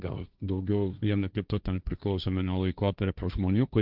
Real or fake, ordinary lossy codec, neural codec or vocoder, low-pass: fake; Opus, 24 kbps; codec, 16 kHz, 1.1 kbps, Voila-Tokenizer; 5.4 kHz